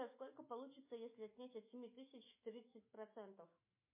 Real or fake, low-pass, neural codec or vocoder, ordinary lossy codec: fake; 3.6 kHz; autoencoder, 48 kHz, 128 numbers a frame, DAC-VAE, trained on Japanese speech; MP3, 24 kbps